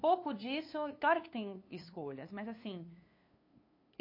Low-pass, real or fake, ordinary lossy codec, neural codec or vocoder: 5.4 kHz; fake; MP3, 32 kbps; codec, 16 kHz, 2 kbps, FunCodec, trained on LibriTTS, 25 frames a second